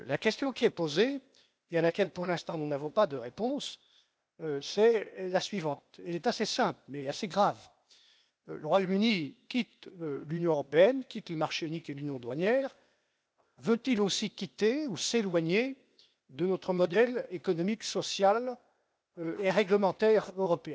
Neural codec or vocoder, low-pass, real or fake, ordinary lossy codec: codec, 16 kHz, 0.8 kbps, ZipCodec; none; fake; none